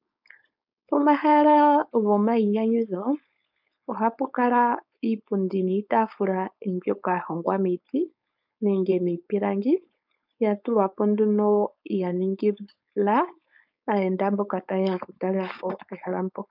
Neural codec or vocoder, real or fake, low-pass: codec, 16 kHz, 4.8 kbps, FACodec; fake; 5.4 kHz